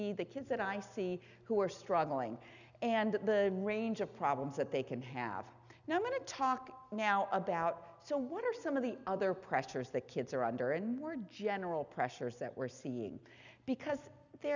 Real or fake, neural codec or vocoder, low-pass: real; none; 7.2 kHz